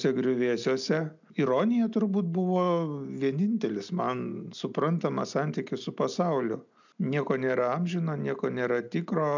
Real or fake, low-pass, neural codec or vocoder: real; 7.2 kHz; none